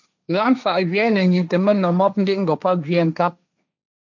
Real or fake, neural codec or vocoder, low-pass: fake; codec, 16 kHz, 1.1 kbps, Voila-Tokenizer; 7.2 kHz